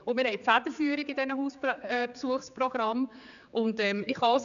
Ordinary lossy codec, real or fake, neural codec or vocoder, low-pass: none; fake; codec, 16 kHz, 4 kbps, X-Codec, HuBERT features, trained on general audio; 7.2 kHz